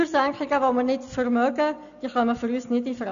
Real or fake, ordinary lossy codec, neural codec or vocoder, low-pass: real; none; none; 7.2 kHz